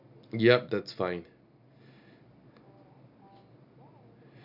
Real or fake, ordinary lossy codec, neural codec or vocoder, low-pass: real; none; none; 5.4 kHz